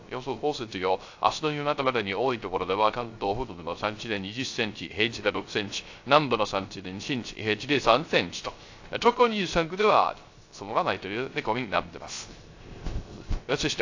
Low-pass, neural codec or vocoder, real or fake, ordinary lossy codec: 7.2 kHz; codec, 16 kHz, 0.3 kbps, FocalCodec; fake; AAC, 48 kbps